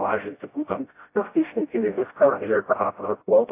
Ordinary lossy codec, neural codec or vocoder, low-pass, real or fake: AAC, 24 kbps; codec, 16 kHz, 0.5 kbps, FreqCodec, smaller model; 3.6 kHz; fake